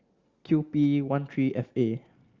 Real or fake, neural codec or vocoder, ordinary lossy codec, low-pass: real; none; Opus, 32 kbps; 7.2 kHz